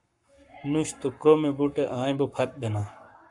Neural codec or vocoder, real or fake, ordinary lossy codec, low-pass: codec, 44.1 kHz, 7.8 kbps, Pupu-Codec; fake; AAC, 64 kbps; 10.8 kHz